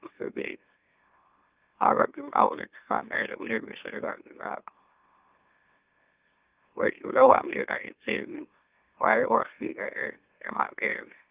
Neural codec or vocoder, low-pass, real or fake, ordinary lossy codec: autoencoder, 44.1 kHz, a latent of 192 numbers a frame, MeloTTS; 3.6 kHz; fake; Opus, 32 kbps